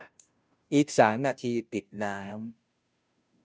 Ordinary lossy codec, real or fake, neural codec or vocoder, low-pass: none; fake; codec, 16 kHz, 0.5 kbps, FunCodec, trained on Chinese and English, 25 frames a second; none